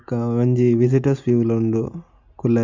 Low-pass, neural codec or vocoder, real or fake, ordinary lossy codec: 7.2 kHz; none; real; none